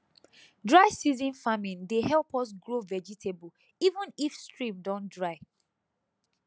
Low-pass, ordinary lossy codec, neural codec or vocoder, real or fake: none; none; none; real